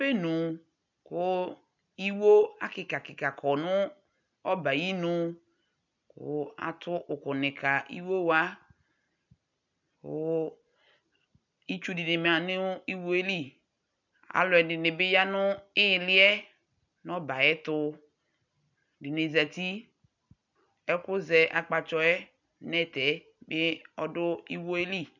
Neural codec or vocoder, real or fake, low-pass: none; real; 7.2 kHz